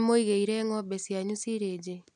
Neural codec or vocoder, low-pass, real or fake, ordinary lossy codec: none; 10.8 kHz; real; none